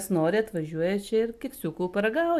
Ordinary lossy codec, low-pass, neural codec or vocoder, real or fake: MP3, 96 kbps; 14.4 kHz; none; real